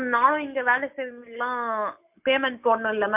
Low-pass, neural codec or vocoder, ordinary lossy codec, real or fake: 3.6 kHz; none; MP3, 32 kbps; real